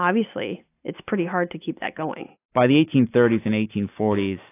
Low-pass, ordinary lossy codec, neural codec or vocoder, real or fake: 3.6 kHz; AAC, 24 kbps; none; real